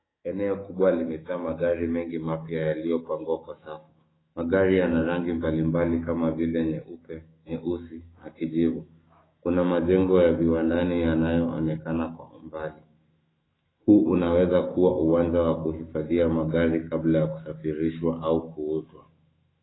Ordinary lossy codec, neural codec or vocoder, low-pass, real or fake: AAC, 16 kbps; autoencoder, 48 kHz, 128 numbers a frame, DAC-VAE, trained on Japanese speech; 7.2 kHz; fake